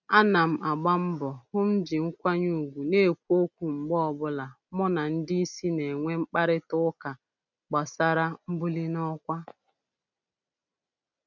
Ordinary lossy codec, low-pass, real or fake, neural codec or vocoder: none; 7.2 kHz; real; none